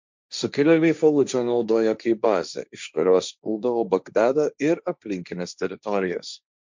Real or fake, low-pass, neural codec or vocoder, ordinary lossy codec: fake; 7.2 kHz; codec, 16 kHz, 1.1 kbps, Voila-Tokenizer; MP3, 64 kbps